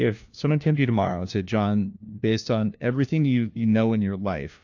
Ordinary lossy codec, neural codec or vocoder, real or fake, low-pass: AAC, 48 kbps; codec, 16 kHz, 1 kbps, FunCodec, trained on LibriTTS, 50 frames a second; fake; 7.2 kHz